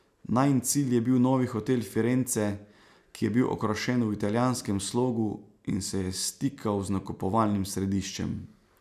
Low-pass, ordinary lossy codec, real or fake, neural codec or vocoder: 14.4 kHz; none; real; none